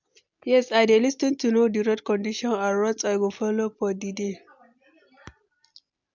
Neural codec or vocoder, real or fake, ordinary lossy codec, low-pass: none; real; MP3, 64 kbps; 7.2 kHz